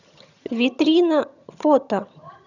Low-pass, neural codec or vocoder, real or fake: 7.2 kHz; vocoder, 22.05 kHz, 80 mel bands, HiFi-GAN; fake